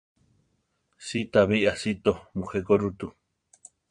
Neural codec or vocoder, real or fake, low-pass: vocoder, 22.05 kHz, 80 mel bands, Vocos; fake; 9.9 kHz